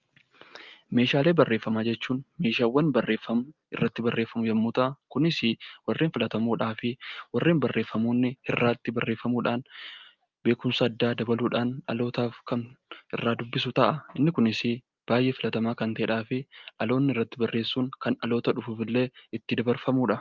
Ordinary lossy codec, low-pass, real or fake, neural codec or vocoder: Opus, 24 kbps; 7.2 kHz; real; none